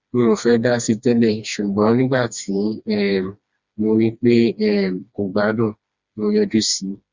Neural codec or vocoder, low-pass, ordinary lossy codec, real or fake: codec, 16 kHz, 2 kbps, FreqCodec, smaller model; 7.2 kHz; Opus, 64 kbps; fake